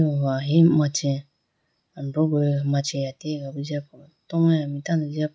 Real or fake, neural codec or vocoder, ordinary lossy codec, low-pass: real; none; none; none